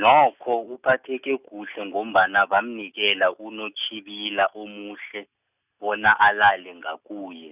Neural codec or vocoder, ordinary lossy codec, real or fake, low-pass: none; none; real; 3.6 kHz